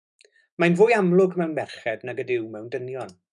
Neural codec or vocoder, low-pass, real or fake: autoencoder, 48 kHz, 128 numbers a frame, DAC-VAE, trained on Japanese speech; 10.8 kHz; fake